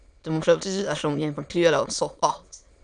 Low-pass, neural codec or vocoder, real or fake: 9.9 kHz; autoencoder, 22.05 kHz, a latent of 192 numbers a frame, VITS, trained on many speakers; fake